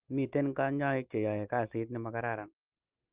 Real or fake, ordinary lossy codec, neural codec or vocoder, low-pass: real; Opus, 32 kbps; none; 3.6 kHz